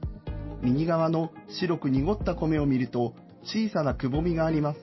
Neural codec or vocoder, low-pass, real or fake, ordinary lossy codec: none; 7.2 kHz; real; MP3, 24 kbps